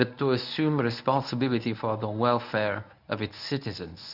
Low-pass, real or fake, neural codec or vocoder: 5.4 kHz; fake; codec, 24 kHz, 0.9 kbps, WavTokenizer, medium speech release version 1